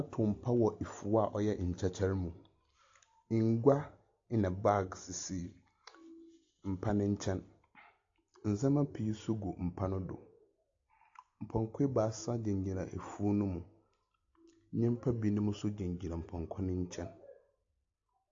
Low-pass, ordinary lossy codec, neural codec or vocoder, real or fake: 7.2 kHz; AAC, 48 kbps; none; real